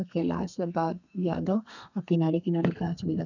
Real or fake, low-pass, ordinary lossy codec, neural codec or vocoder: fake; 7.2 kHz; none; codec, 32 kHz, 1.9 kbps, SNAC